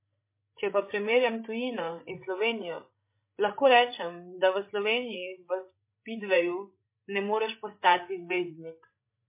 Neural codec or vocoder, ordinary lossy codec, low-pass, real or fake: codec, 16 kHz, 16 kbps, FreqCodec, larger model; MP3, 24 kbps; 3.6 kHz; fake